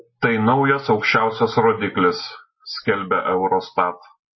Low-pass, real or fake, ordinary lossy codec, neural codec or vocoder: 7.2 kHz; real; MP3, 24 kbps; none